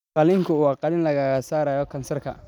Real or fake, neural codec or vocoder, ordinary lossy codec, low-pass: real; none; none; 19.8 kHz